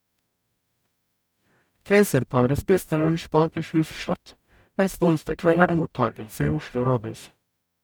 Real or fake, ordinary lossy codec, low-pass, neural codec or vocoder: fake; none; none; codec, 44.1 kHz, 0.9 kbps, DAC